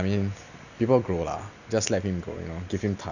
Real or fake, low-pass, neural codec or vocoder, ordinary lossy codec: real; 7.2 kHz; none; none